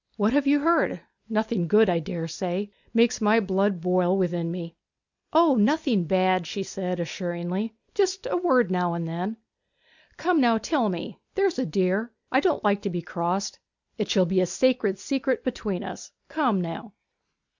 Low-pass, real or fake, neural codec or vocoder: 7.2 kHz; real; none